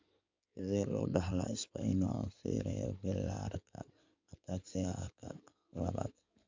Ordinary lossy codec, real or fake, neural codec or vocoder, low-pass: MP3, 64 kbps; fake; codec, 16 kHz in and 24 kHz out, 2.2 kbps, FireRedTTS-2 codec; 7.2 kHz